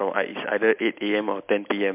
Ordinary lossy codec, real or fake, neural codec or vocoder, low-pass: MP3, 32 kbps; real; none; 3.6 kHz